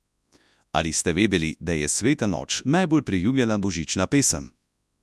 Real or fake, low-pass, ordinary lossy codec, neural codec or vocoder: fake; none; none; codec, 24 kHz, 0.9 kbps, WavTokenizer, large speech release